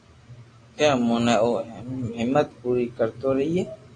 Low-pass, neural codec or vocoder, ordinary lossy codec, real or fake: 9.9 kHz; none; AAC, 32 kbps; real